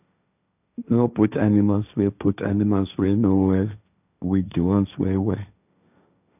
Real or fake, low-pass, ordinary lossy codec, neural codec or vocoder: fake; 3.6 kHz; none; codec, 16 kHz, 1.1 kbps, Voila-Tokenizer